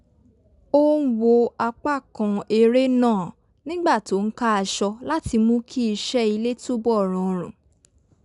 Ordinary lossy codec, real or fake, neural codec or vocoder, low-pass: none; real; none; 10.8 kHz